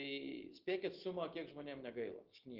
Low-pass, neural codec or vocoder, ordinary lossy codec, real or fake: 5.4 kHz; none; Opus, 16 kbps; real